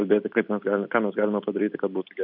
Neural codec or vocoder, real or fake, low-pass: none; real; 5.4 kHz